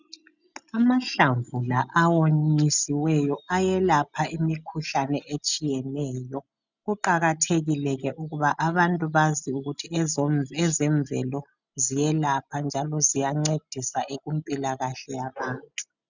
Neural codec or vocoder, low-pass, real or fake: none; 7.2 kHz; real